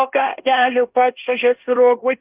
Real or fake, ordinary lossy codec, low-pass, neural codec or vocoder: fake; Opus, 24 kbps; 3.6 kHz; codec, 16 kHz in and 24 kHz out, 0.9 kbps, LongCat-Audio-Codec, fine tuned four codebook decoder